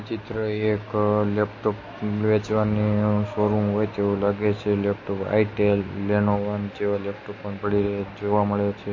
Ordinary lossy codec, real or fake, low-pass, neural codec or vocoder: MP3, 32 kbps; real; 7.2 kHz; none